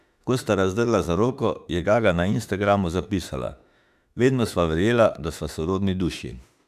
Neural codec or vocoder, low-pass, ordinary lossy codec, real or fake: autoencoder, 48 kHz, 32 numbers a frame, DAC-VAE, trained on Japanese speech; 14.4 kHz; none; fake